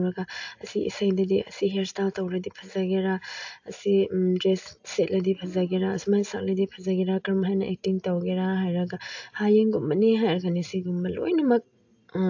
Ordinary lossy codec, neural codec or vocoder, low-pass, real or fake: AAC, 48 kbps; none; 7.2 kHz; real